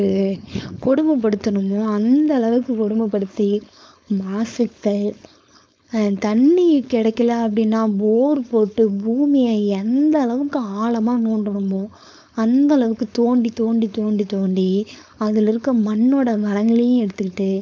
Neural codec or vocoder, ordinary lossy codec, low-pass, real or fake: codec, 16 kHz, 4.8 kbps, FACodec; none; none; fake